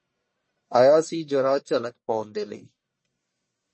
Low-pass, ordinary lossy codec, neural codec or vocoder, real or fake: 9.9 kHz; MP3, 32 kbps; codec, 44.1 kHz, 1.7 kbps, Pupu-Codec; fake